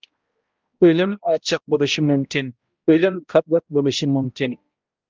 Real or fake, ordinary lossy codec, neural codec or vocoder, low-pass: fake; Opus, 24 kbps; codec, 16 kHz, 0.5 kbps, X-Codec, HuBERT features, trained on balanced general audio; 7.2 kHz